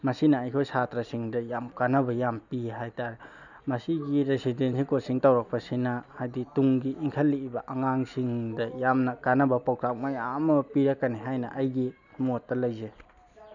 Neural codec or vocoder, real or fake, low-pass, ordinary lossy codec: none; real; 7.2 kHz; none